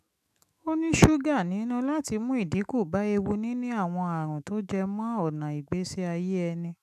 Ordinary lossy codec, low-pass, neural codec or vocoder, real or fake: none; 14.4 kHz; autoencoder, 48 kHz, 128 numbers a frame, DAC-VAE, trained on Japanese speech; fake